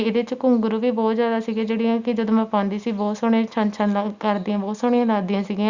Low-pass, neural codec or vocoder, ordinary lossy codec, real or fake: 7.2 kHz; none; Opus, 64 kbps; real